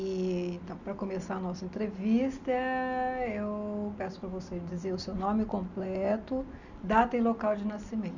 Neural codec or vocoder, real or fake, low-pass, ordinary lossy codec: none; real; 7.2 kHz; none